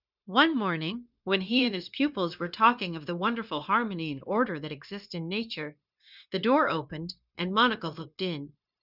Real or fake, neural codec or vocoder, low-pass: fake; codec, 16 kHz, 0.9 kbps, LongCat-Audio-Codec; 5.4 kHz